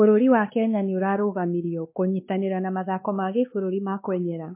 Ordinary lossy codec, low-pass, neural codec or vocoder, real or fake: MP3, 24 kbps; 3.6 kHz; codec, 16 kHz, 2 kbps, X-Codec, WavLM features, trained on Multilingual LibriSpeech; fake